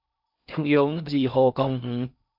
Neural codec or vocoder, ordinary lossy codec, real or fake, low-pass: codec, 16 kHz in and 24 kHz out, 0.6 kbps, FocalCodec, streaming, 2048 codes; MP3, 48 kbps; fake; 5.4 kHz